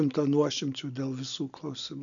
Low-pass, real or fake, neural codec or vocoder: 7.2 kHz; real; none